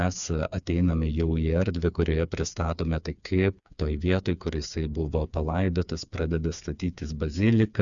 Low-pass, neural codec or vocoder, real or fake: 7.2 kHz; codec, 16 kHz, 4 kbps, FreqCodec, smaller model; fake